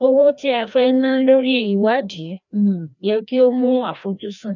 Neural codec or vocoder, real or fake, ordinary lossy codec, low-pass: codec, 16 kHz, 1 kbps, FreqCodec, larger model; fake; none; 7.2 kHz